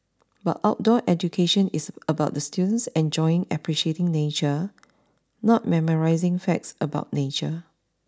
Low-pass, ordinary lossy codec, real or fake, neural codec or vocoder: none; none; real; none